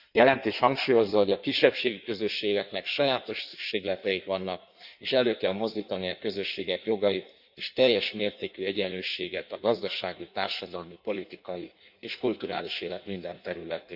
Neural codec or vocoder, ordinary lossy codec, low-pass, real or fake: codec, 16 kHz in and 24 kHz out, 1.1 kbps, FireRedTTS-2 codec; none; 5.4 kHz; fake